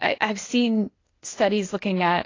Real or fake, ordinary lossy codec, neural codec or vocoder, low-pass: fake; AAC, 32 kbps; codec, 16 kHz, 0.8 kbps, ZipCodec; 7.2 kHz